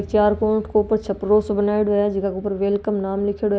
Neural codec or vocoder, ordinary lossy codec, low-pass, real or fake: none; none; none; real